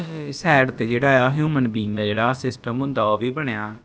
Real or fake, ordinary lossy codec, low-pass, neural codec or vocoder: fake; none; none; codec, 16 kHz, about 1 kbps, DyCAST, with the encoder's durations